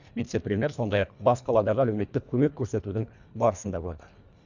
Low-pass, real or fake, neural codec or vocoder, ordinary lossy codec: 7.2 kHz; fake; codec, 24 kHz, 1.5 kbps, HILCodec; none